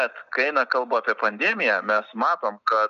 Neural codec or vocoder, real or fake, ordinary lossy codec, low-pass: none; real; MP3, 96 kbps; 7.2 kHz